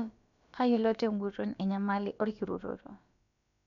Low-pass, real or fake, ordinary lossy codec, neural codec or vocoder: 7.2 kHz; fake; none; codec, 16 kHz, about 1 kbps, DyCAST, with the encoder's durations